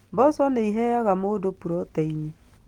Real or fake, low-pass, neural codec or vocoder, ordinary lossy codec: real; 19.8 kHz; none; Opus, 24 kbps